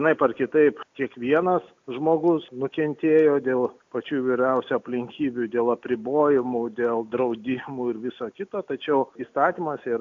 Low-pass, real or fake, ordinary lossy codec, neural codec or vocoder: 7.2 kHz; real; AAC, 48 kbps; none